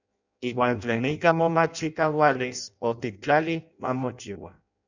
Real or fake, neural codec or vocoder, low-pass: fake; codec, 16 kHz in and 24 kHz out, 0.6 kbps, FireRedTTS-2 codec; 7.2 kHz